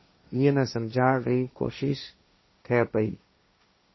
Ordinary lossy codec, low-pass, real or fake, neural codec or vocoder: MP3, 24 kbps; 7.2 kHz; fake; codec, 16 kHz, 1.1 kbps, Voila-Tokenizer